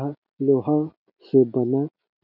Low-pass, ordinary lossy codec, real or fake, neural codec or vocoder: 5.4 kHz; none; real; none